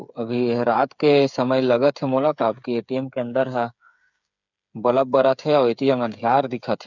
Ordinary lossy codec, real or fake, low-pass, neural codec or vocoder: none; fake; 7.2 kHz; codec, 16 kHz, 8 kbps, FreqCodec, smaller model